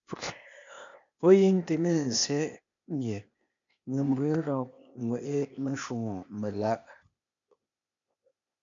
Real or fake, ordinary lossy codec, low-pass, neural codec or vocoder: fake; MP3, 64 kbps; 7.2 kHz; codec, 16 kHz, 0.8 kbps, ZipCodec